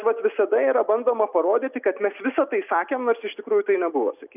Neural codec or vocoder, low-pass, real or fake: none; 3.6 kHz; real